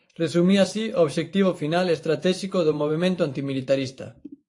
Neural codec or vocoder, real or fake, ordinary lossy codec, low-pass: vocoder, 24 kHz, 100 mel bands, Vocos; fake; AAC, 48 kbps; 10.8 kHz